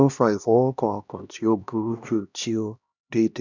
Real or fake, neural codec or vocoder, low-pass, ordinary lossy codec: fake; codec, 16 kHz, 1 kbps, X-Codec, HuBERT features, trained on LibriSpeech; 7.2 kHz; none